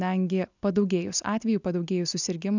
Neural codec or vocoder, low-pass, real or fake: none; 7.2 kHz; real